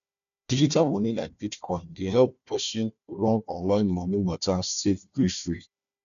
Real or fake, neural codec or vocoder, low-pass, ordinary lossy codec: fake; codec, 16 kHz, 1 kbps, FunCodec, trained on Chinese and English, 50 frames a second; 7.2 kHz; none